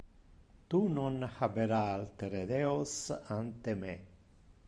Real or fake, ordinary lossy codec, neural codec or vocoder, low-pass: fake; MP3, 64 kbps; vocoder, 44.1 kHz, 128 mel bands every 512 samples, BigVGAN v2; 9.9 kHz